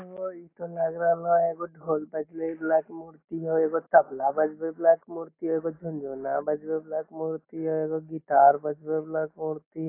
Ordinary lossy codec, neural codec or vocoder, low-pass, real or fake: AAC, 24 kbps; none; 3.6 kHz; real